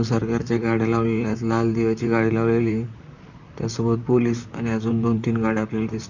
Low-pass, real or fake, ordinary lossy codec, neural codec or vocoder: 7.2 kHz; fake; none; vocoder, 44.1 kHz, 128 mel bands, Pupu-Vocoder